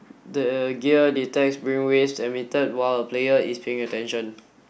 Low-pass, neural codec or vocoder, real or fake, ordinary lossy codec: none; none; real; none